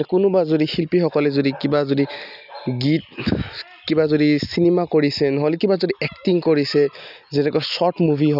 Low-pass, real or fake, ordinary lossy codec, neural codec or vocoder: 5.4 kHz; real; none; none